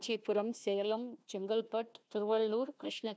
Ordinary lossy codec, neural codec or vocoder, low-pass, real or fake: none; codec, 16 kHz, 1 kbps, FunCodec, trained on Chinese and English, 50 frames a second; none; fake